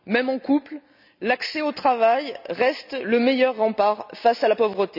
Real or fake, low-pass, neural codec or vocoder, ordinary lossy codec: real; 5.4 kHz; none; none